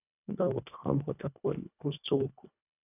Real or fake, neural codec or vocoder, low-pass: fake; codec, 24 kHz, 1.5 kbps, HILCodec; 3.6 kHz